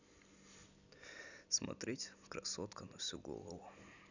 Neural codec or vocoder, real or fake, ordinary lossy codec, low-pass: none; real; none; 7.2 kHz